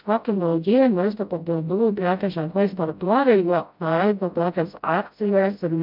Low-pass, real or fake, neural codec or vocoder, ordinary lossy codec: 5.4 kHz; fake; codec, 16 kHz, 0.5 kbps, FreqCodec, smaller model; MP3, 48 kbps